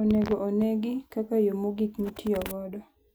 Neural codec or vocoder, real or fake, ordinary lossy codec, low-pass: none; real; none; none